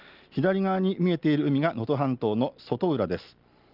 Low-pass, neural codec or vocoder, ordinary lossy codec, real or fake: 5.4 kHz; none; Opus, 32 kbps; real